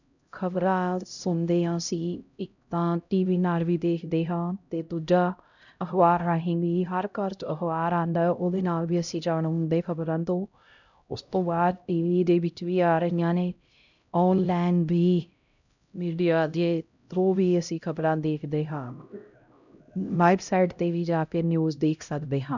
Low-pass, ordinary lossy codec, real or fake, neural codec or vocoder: 7.2 kHz; none; fake; codec, 16 kHz, 0.5 kbps, X-Codec, HuBERT features, trained on LibriSpeech